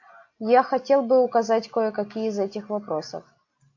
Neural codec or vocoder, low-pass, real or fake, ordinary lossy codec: none; 7.2 kHz; real; AAC, 48 kbps